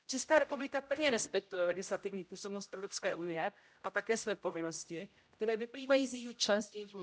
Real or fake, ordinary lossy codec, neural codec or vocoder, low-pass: fake; none; codec, 16 kHz, 0.5 kbps, X-Codec, HuBERT features, trained on general audio; none